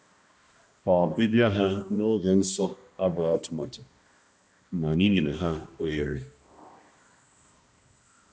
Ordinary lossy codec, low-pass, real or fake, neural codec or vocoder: none; none; fake; codec, 16 kHz, 1 kbps, X-Codec, HuBERT features, trained on balanced general audio